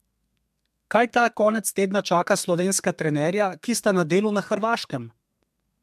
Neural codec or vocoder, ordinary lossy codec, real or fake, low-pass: codec, 32 kHz, 1.9 kbps, SNAC; MP3, 96 kbps; fake; 14.4 kHz